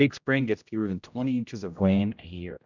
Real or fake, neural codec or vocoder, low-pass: fake; codec, 16 kHz, 0.5 kbps, X-Codec, HuBERT features, trained on general audio; 7.2 kHz